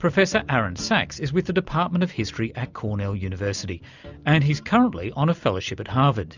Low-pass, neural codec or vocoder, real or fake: 7.2 kHz; none; real